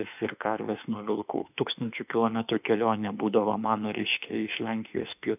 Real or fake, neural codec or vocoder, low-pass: fake; autoencoder, 48 kHz, 32 numbers a frame, DAC-VAE, trained on Japanese speech; 3.6 kHz